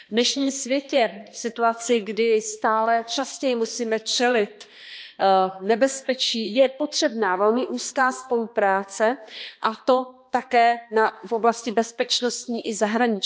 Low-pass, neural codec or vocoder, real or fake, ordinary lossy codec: none; codec, 16 kHz, 2 kbps, X-Codec, HuBERT features, trained on balanced general audio; fake; none